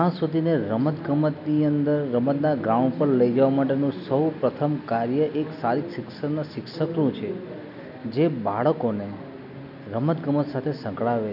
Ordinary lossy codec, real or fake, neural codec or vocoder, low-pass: none; real; none; 5.4 kHz